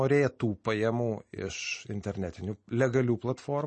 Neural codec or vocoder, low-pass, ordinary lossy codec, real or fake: none; 10.8 kHz; MP3, 32 kbps; real